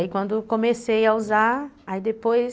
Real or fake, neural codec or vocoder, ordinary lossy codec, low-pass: real; none; none; none